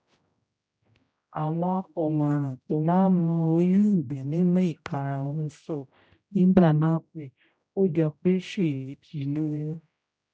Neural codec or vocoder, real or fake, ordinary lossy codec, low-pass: codec, 16 kHz, 0.5 kbps, X-Codec, HuBERT features, trained on general audio; fake; none; none